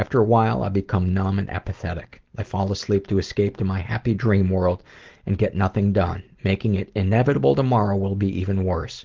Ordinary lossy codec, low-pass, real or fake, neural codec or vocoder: Opus, 16 kbps; 7.2 kHz; real; none